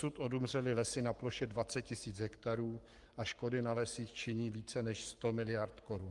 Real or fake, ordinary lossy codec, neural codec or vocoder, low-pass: fake; Opus, 32 kbps; codec, 44.1 kHz, 7.8 kbps, Pupu-Codec; 10.8 kHz